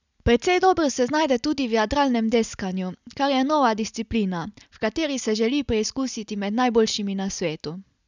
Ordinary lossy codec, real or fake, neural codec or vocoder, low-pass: none; real; none; 7.2 kHz